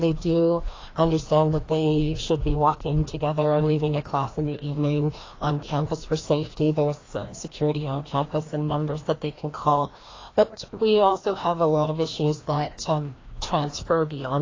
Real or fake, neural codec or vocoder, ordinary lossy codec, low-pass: fake; codec, 16 kHz, 1 kbps, FreqCodec, larger model; AAC, 32 kbps; 7.2 kHz